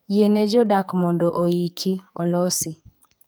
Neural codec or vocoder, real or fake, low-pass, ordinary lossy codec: codec, 44.1 kHz, 2.6 kbps, SNAC; fake; none; none